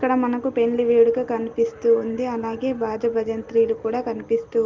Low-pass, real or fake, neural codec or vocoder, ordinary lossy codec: 7.2 kHz; real; none; Opus, 32 kbps